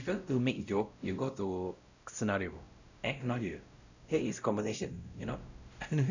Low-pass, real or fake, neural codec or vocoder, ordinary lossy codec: 7.2 kHz; fake; codec, 16 kHz, 0.5 kbps, X-Codec, WavLM features, trained on Multilingual LibriSpeech; none